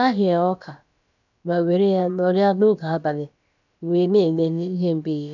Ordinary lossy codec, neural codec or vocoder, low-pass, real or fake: none; codec, 16 kHz, about 1 kbps, DyCAST, with the encoder's durations; 7.2 kHz; fake